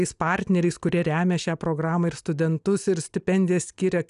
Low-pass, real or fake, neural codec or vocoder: 10.8 kHz; real; none